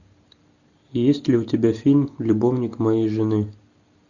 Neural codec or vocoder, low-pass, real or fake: none; 7.2 kHz; real